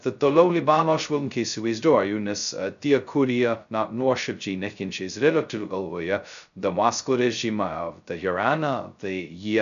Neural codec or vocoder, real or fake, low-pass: codec, 16 kHz, 0.2 kbps, FocalCodec; fake; 7.2 kHz